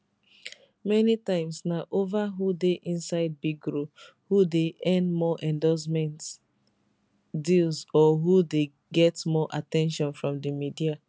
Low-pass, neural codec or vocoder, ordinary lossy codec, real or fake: none; none; none; real